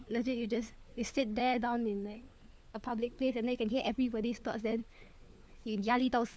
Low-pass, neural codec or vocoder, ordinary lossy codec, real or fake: none; codec, 16 kHz, 4 kbps, FunCodec, trained on LibriTTS, 50 frames a second; none; fake